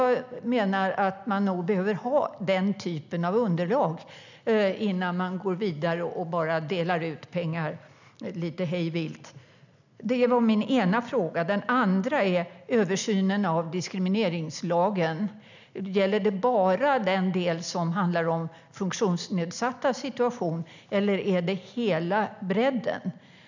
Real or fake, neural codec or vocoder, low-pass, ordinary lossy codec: real; none; 7.2 kHz; none